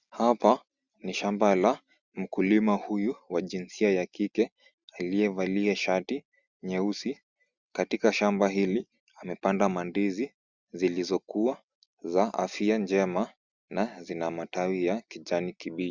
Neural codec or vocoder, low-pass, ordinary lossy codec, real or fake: none; 7.2 kHz; Opus, 64 kbps; real